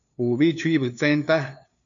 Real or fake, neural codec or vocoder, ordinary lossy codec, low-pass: fake; codec, 16 kHz, 2 kbps, FunCodec, trained on LibriTTS, 25 frames a second; AAC, 64 kbps; 7.2 kHz